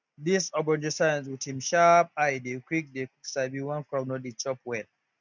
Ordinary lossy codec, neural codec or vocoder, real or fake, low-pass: none; none; real; 7.2 kHz